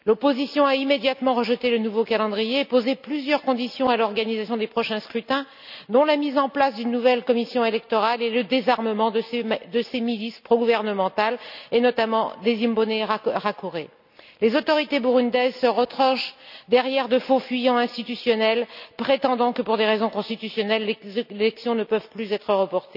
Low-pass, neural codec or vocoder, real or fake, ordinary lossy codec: 5.4 kHz; none; real; none